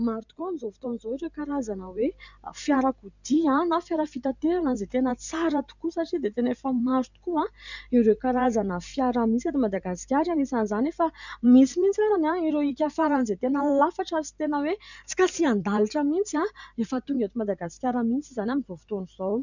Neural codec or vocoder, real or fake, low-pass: vocoder, 44.1 kHz, 128 mel bands every 512 samples, BigVGAN v2; fake; 7.2 kHz